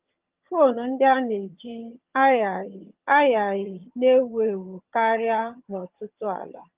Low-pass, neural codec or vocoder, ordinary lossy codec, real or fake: 3.6 kHz; vocoder, 22.05 kHz, 80 mel bands, HiFi-GAN; Opus, 32 kbps; fake